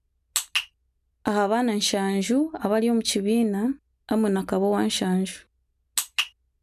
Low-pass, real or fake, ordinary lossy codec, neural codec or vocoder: 14.4 kHz; real; none; none